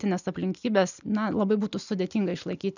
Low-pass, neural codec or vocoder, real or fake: 7.2 kHz; none; real